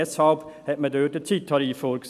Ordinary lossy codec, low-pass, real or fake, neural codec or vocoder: none; 14.4 kHz; real; none